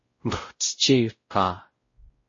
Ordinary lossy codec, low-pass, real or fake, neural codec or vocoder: MP3, 32 kbps; 7.2 kHz; fake; codec, 16 kHz, 0.5 kbps, X-Codec, WavLM features, trained on Multilingual LibriSpeech